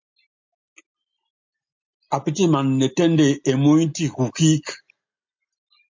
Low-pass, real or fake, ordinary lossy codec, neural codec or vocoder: 7.2 kHz; real; MP3, 64 kbps; none